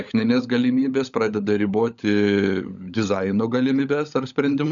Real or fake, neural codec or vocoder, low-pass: fake; codec, 16 kHz, 16 kbps, FunCodec, trained on LibriTTS, 50 frames a second; 7.2 kHz